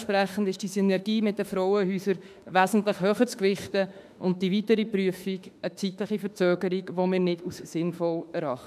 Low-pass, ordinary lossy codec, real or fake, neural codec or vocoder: 14.4 kHz; none; fake; autoencoder, 48 kHz, 32 numbers a frame, DAC-VAE, trained on Japanese speech